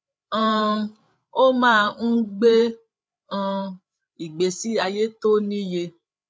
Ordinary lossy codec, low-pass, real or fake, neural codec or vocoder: none; none; fake; codec, 16 kHz, 8 kbps, FreqCodec, larger model